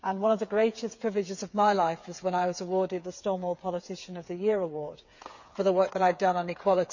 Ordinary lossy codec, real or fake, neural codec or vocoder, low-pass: none; fake; codec, 16 kHz, 8 kbps, FreqCodec, smaller model; 7.2 kHz